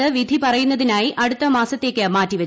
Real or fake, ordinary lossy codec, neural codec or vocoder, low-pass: real; none; none; none